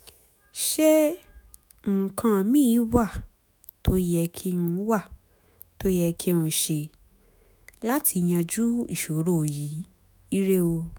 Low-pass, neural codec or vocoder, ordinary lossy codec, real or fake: none; autoencoder, 48 kHz, 128 numbers a frame, DAC-VAE, trained on Japanese speech; none; fake